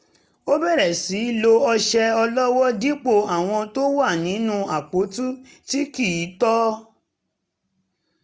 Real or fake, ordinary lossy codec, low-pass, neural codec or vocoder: real; none; none; none